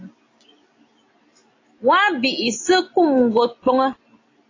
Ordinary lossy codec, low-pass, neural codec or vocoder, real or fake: AAC, 32 kbps; 7.2 kHz; none; real